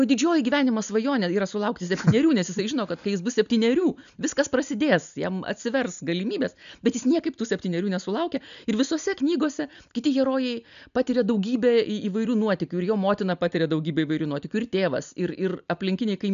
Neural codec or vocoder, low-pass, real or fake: none; 7.2 kHz; real